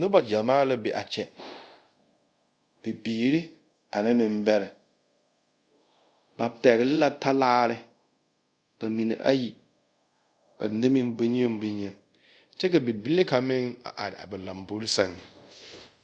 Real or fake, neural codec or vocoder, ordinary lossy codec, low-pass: fake; codec, 24 kHz, 0.5 kbps, DualCodec; Opus, 64 kbps; 9.9 kHz